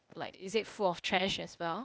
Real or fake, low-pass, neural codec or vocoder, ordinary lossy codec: fake; none; codec, 16 kHz, 0.8 kbps, ZipCodec; none